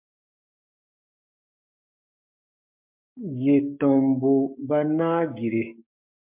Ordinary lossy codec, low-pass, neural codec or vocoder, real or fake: MP3, 32 kbps; 3.6 kHz; codec, 16 kHz, 6 kbps, DAC; fake